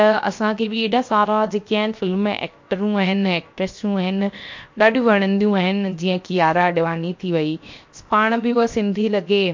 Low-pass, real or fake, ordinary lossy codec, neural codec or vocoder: 7.2 kHz; fake; MP3, 48 kbps; codec, 16 kHz, 0.7 kbps, FocalCodec